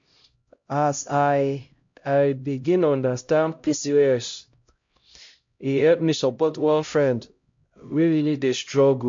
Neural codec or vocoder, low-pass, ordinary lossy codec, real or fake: codec, 16 kHz, 0.5 kbps, X-Codec, HuBERT features, trained on LibriSpeech; 7.2 kHz; MP3, 48 kbps; fake